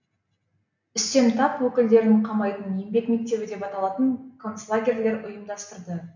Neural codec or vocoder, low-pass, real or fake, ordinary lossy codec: none; 7.2 kHz; real; none